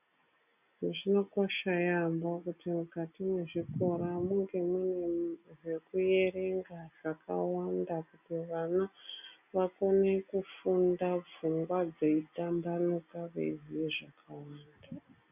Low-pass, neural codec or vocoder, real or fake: 3.6 kHz; none; real